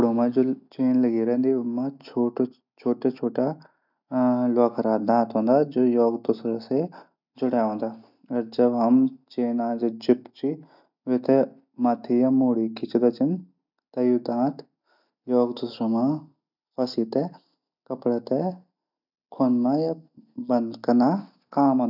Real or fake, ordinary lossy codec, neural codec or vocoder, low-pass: real; none; none; 5.4 kHz